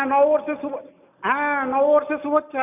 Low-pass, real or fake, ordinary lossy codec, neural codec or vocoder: 3.6 kHz; real; none; none